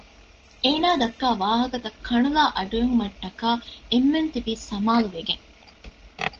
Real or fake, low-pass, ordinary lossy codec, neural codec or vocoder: real; 7.2 kHz; Opus, 16 kbps; none